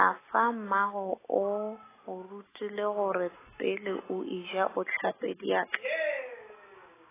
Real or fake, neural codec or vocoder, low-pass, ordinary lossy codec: real; none; 3.6 kHz; AAC, 16 kbps